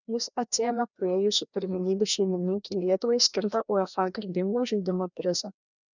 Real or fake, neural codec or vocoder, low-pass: fake; codec, 16 kHz, 1 kbps, FreqCodec, larger model; 7.2 kHz